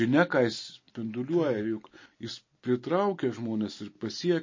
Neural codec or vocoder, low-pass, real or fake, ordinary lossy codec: none; 7.2 kHz; real; MP3, 32 kbps